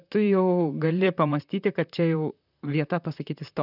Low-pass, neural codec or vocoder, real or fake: 5.4 kHz; vocoder, 44.1 kHz, 128 mel bands, Pupu-Vocoder; fake